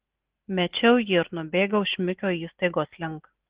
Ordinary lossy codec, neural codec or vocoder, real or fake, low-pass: Opus, 16 kbps; none; real; 3.6 kHz